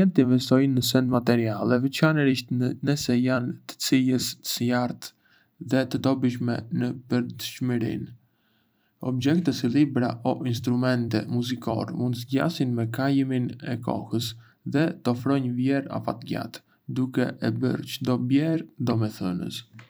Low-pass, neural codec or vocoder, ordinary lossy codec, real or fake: none; vocoder, 44.1 kHz, 128 mel bands every 256 samples, BigVGAN v2; none; fake